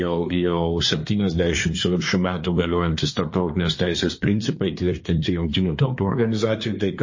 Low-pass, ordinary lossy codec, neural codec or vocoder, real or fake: 7.2 kHz; MP3, 32 kbps; codec, 24 kHz, 1 kbps, SNAC; fake